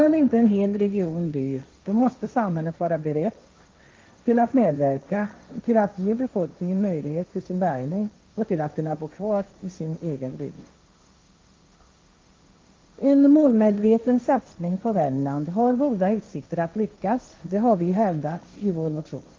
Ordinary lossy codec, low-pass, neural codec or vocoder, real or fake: Opus, 24 kbps; 7.2 kHz; codec, 16 kHz, 1.1 kbps, Voila-Tokenizer; fake